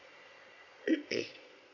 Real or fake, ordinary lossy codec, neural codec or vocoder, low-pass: fake; none; vocoder, 22.05 kHz, 80 mel bands, Vocos; 7.2 kHz